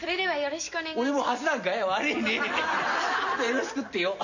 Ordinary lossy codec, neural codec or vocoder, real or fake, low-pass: none; none; real; 7.2 kHz